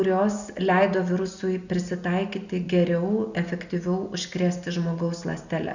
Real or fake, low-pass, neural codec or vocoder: real; 7.2 kHz; none